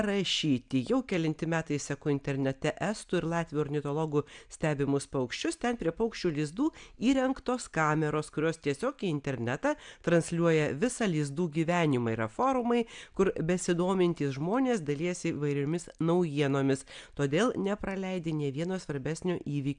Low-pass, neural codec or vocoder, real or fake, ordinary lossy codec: 9.9 kHz; none; real; MP3, 96 kbps